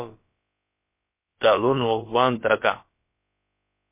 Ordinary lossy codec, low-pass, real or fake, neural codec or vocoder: MP3, 24 kbps; 3.6 kHz; fake; codec, 16 kHz, about 1 kbps, DyCAST, with the encoder's durations